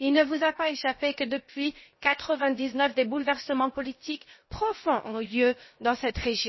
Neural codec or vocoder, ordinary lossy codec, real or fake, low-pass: codec, 16 kHz, 0.7 kbps, FocalCodec; MP3, 24 kbps; fake; 7.2 kHz